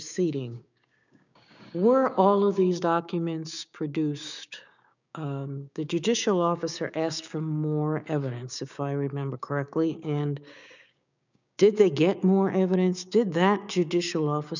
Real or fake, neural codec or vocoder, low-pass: fake; codec, 16 kHz, 6 kbps, DAC; 7.2 kHz